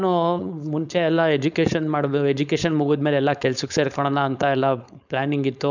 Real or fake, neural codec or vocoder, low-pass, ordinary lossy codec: fake; codec, 16 kHz, 4.8 kbps, FACodec; 7.2 kHz; none